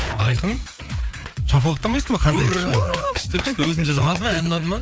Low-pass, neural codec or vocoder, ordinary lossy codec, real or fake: none; codec, 16 kHz, 4 kbps, FreqCodec, larger model; none; fake